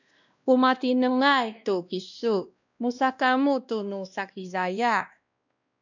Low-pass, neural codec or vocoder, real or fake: 7.2 kHz; codec, 16 kHz, 1 kbps, X-Codec, WavLM features, trained on Multilingual LibriSpeech; fake